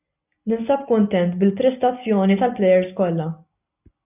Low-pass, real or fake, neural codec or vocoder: 3.6 kHz; real; none